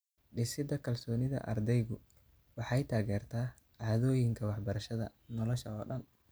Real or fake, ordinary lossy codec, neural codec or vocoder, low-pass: real; none; none; none